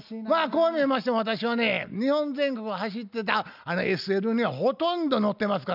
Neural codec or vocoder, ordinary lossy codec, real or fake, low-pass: none; none; real; 5.4 kHz